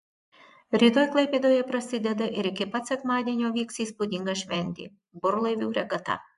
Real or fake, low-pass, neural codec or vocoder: fake; 10.8 kHz; vocoder, 24 kHz, 100 mel bands, Vocos